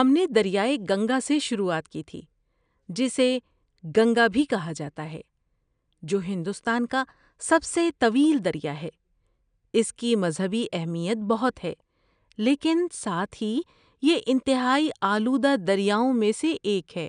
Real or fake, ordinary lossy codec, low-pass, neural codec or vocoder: real; none; 9.9 kHz; none